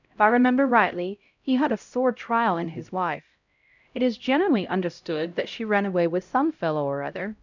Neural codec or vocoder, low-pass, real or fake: codec, 16 kHz, 0.5 kbps, X-Codec, HuBERT features, trained on LibriSpeech; 7.2 kHz; fake